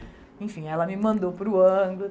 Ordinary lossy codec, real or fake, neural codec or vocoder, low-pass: none; real; none; none